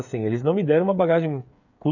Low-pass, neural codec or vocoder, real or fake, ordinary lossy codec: 7.2 kHz; codec, 16 kHz, 8 kbps, FreqCodec, smaller model; fake; none